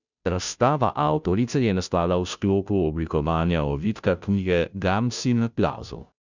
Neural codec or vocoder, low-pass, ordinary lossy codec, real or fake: codec, 16 kHz, 0.5 kbps, FunCodec, trained on Chinese and English, 25 frames a second; 7.2 kHz; none; fake